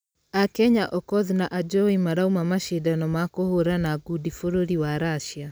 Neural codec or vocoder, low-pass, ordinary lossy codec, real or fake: none; none; none; real